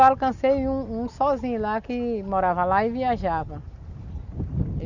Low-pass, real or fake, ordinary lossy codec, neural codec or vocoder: 7.2 kHz; real; none; none